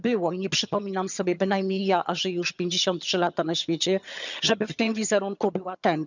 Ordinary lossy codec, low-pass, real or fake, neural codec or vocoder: none; 7.2 kHz; fake; vocoder, 22.05 kHz, 80 mel bands, HiFi-GAN